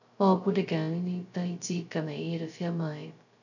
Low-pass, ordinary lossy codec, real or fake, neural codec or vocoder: 7.2 kHz; none; fake; codec, 16 kHz, 0.2 kbps, FocalCodec